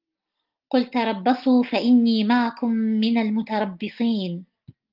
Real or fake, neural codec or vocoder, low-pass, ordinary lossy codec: real; none; 5.4 kHz; Opus, 24 kbps